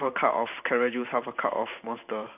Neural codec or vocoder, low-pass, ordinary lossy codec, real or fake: vocoder, 44.1 kHz, 128 mel bands every 512 samples, BigVGAN v2; 3.6 kHz; none; fake